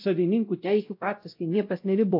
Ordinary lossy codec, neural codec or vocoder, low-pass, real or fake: AAC, 32 kbps; codec, 16 kHz, 0.5 kbps, X-Codec, WavLM features, trained on Multilingual LibriSpeech; 5.4 kHz; fake